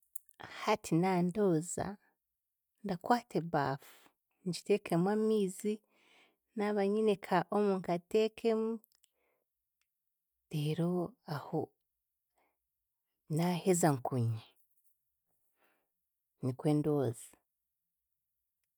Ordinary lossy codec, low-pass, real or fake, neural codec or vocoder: none; none; real; none